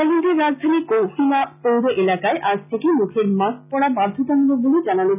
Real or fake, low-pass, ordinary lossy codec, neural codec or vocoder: real; 3.6 kHz; none; none